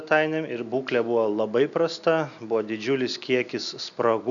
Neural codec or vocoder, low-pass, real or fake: none; 7.2 kHz; real